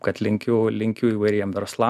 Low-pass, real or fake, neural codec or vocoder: 14.4 kHz; real; none